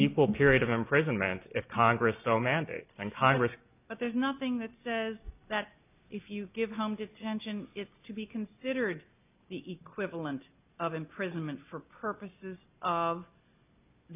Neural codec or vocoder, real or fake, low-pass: none; real; 3.6 kHz